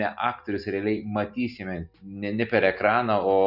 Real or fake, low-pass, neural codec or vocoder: real; 5.4 kHz; none